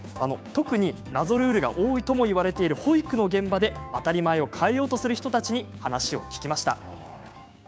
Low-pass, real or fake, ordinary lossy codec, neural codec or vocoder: none; fake; none; codec, 16 kHz, 6 kbps, DAC